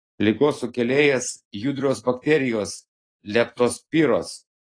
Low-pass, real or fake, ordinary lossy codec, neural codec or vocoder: 9.9 kHz; real; AAC, 32 kbps; none